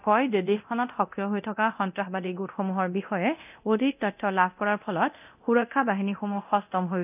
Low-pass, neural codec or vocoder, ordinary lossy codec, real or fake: 3.6 kHz; codec, 24 kHz, 0.9 kbps, DualCodec; none; fake